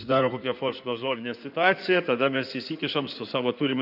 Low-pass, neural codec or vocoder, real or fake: 5.4 kHz; codec, 16 kHz in and 24 kHz out, 2.2 kbps, FireRedTTS-2 codec; fake